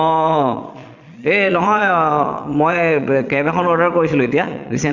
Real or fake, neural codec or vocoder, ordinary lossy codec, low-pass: fake; vocoder, 22.05 kHz, 80 mel bands, Vocos; none; 7.2 kHz